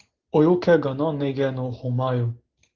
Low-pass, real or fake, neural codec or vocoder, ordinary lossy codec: 7.2 kHz; real; none; Opus, 16 kbps